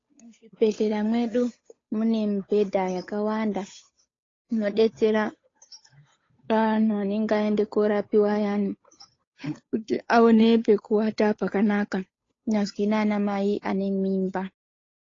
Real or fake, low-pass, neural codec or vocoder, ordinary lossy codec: fake; 7.2 kHz; codec, 16 kHz, 8 kbps, FunCodec, trained on Chinese and English, 25 frames a second; AAC, 32 kbps